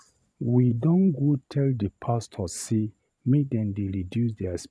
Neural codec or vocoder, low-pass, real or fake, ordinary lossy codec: vocoder, 22.05 kHz, 80 mel bands, Vocos; none; fake; none